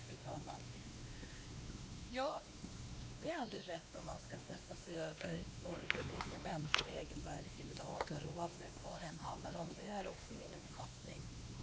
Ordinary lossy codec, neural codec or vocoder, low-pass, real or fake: none; codec, 16 kHz, 2 kbps, X-Codec, WavLM features, trained on Multilingual LibriSpeech; none; fake